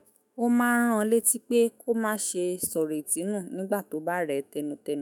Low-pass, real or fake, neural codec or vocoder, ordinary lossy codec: none; fake; autoencoder, 48 kHz, 128 numbers a frame, DAC-VAE, trained on Japanese speech; none